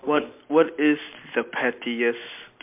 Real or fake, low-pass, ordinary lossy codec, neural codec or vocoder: real; 3.6 kHz; MP3, 32 kbps; none